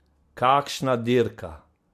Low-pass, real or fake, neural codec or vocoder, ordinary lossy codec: 14.4 kHz; real; none; MP3, 64 kbps